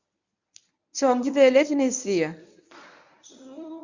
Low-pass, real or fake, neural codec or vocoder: 7.2 kHz; fake; codec, 24 kHz, 0.9 kbps, WavTokenizer, medium speech release version 1